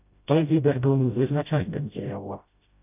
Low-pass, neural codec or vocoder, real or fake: 3.6 kHz; codec, 16 kHz, 0.5 kbps, FreqCodec, smaller model; fake